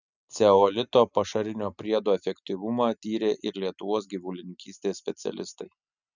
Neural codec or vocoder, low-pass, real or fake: none; 7.2 kHz; real